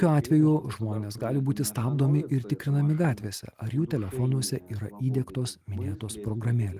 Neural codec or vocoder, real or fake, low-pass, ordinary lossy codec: none; real; 14.4 kHz; Opus, 24 kbps